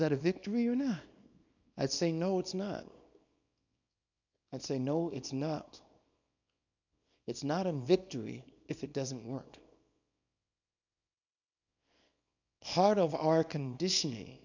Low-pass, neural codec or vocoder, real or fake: 7.2 kHz; codec, 24 kHz, 0.9 kbps, WavTokenizer, small release; fake